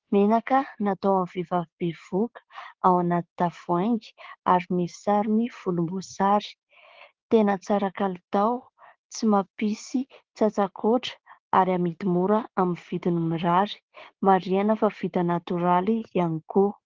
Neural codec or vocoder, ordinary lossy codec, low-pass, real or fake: codec, 16 kHz, 6 kbps, DAC; Opus, 16 kbps; 7.2 kHz; fake